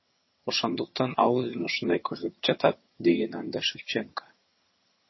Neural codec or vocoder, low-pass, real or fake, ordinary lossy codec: vocoder, 22.05 kHz, 80 mel bands, HiFi-GAN; 7.2 kHz; fake; MP3, 24 kbps